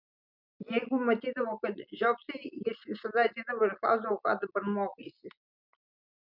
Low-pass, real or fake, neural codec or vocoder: 5.4 kHz; real; none